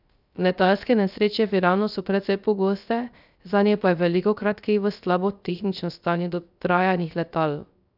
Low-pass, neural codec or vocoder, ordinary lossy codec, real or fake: 5.4 kHz; codec, 16 kHz, 0.3 kbps, FocalCodec; none; fake